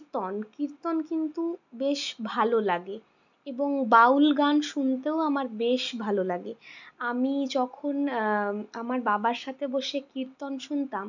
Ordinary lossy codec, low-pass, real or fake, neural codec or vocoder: none; 7.2 kHz; real; none